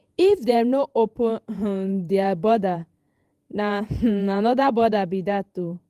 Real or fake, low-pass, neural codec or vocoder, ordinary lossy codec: fake; 14.4 kHz; vocoder, 48 kHz, 128 mel bands, Vocos; Opus, 32 kbps